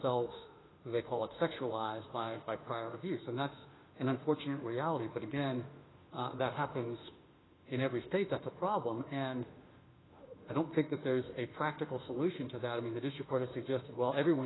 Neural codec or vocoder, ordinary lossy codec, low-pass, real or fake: autoencoder, 48 kHz, 32 numbers a frame, DAC-VAE, trained on Japanese speech; AAC, 16 kbps; 7.2 kHz; fake